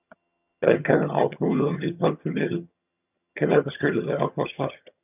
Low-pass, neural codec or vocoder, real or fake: 3.6 kHz; vocoder, 22.05 kHz, 80 mel bands, HiFi-GAN; fake